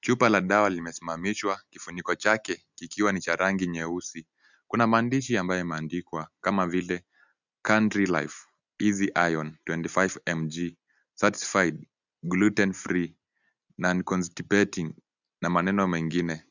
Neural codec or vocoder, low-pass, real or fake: none; 7.2 kHz; real